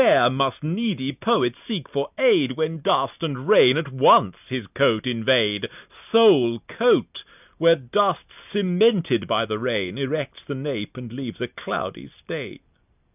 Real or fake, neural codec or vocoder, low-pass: real; none; 3.6 kHz